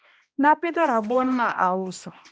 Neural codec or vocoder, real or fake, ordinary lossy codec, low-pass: codec, 16 kHz, 1 kbps, X-Codec, HuBERT features, trained on balanced general audio; fake; none; none